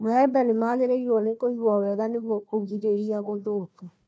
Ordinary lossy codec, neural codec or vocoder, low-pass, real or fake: none; codec, 16 kHz, 1 kbps, FunCodec, trained on Chinese and English, 50 frames a second; none; fake